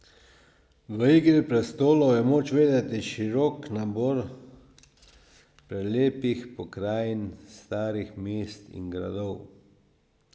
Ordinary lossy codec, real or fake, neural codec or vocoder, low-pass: none; real; none; none